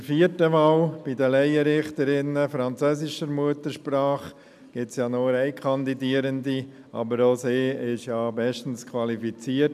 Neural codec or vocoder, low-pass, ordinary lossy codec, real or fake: none; 14.4 kHz; none; real